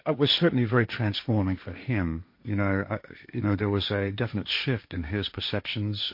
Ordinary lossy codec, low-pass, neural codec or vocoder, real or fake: MP3, 32 kbps; 5.4 kHz; codec, 16 kHz, 1.1 kbps, Voila-Tokenizer; fake